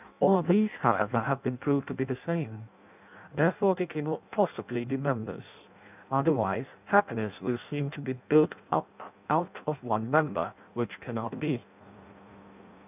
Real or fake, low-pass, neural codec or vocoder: fake; 3.6 kHz; codec, 16 kHz in and 24 kHz out, 0.6 kbps, FireRedTTS-2 codec